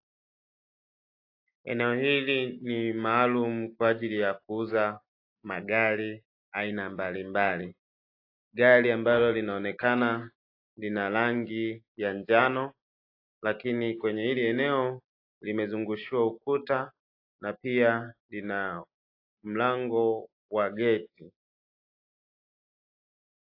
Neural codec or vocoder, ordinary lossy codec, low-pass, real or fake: none; AAC, 32 kbps; 5.4 kHz; real